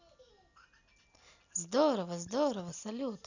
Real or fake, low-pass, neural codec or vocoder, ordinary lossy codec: real; 7.2 kHz; none; none